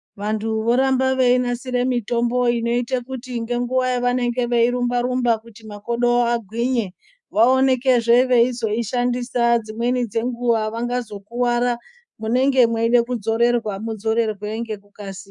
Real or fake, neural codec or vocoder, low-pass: fake; codec, 24 kHz, 3.1 kbps, DualCodec; 10.8 kHz